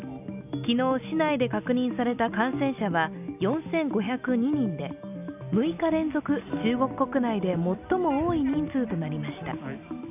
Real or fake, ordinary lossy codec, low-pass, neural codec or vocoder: real; none; 3.6 kHz; none